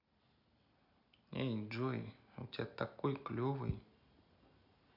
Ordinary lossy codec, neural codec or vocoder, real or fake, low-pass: none; none; real; 5.4 kHz